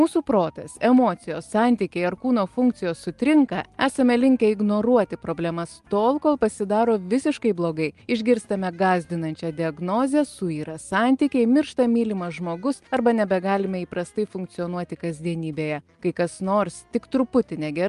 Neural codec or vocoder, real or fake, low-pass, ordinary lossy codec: none; real; 10.8 kHz; Opus, 32 kbps